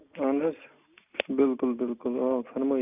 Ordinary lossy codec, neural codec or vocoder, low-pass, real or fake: none; none; 3.6 kHz; real